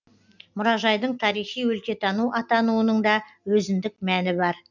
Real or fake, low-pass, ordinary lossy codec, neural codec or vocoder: real; 7.2 kHz; none; none